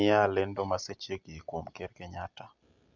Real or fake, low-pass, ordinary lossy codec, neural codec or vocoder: real; 7.2 kHz; MP3, 64 kbps; none